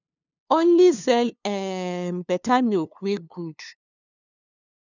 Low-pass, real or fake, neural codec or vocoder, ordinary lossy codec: 7.2 kHz; fake; codec, 16 kHz, 2 kbps, FunCodec, trained on LibriTTS, 25 frames a second; none